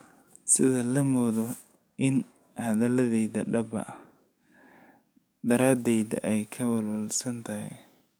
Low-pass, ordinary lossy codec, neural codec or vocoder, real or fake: none; none; codec, 44.1 kHz, 7.8 kbps, DAC; fake